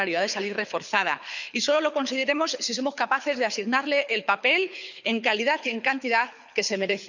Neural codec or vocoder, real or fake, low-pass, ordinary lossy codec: codec, 24 kHz, 6 kbps, HILCodec; fake; 7.2 kHz; none